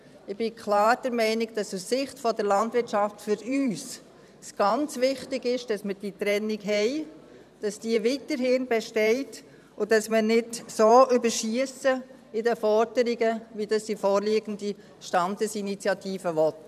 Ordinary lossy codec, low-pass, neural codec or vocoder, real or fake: none; 14.4 kHz; vocoder, 44.1 kHz, 128 mel bands every 512 samples, BigVGAN v2; fake